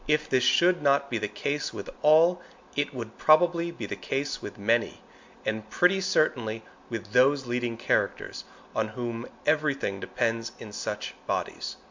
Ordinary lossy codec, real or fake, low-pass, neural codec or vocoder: MP3, 64 kbps; real; 7.2 kHz; none